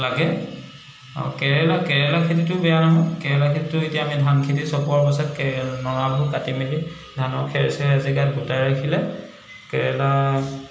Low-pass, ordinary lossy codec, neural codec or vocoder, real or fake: none; none; none; real